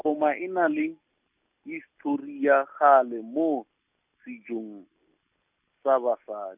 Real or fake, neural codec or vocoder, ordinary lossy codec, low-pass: real; none; none; 3.6 kHz